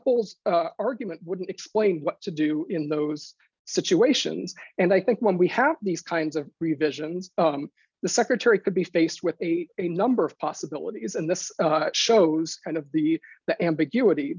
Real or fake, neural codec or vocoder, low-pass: real; none; 7.2 kHz